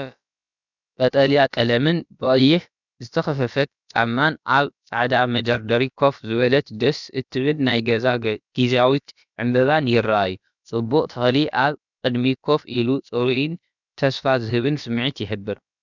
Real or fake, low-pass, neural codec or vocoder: fake; 7.2 kHz; codec, 16 kHz, about 1 kbps, DyCAST, with the encoder's durations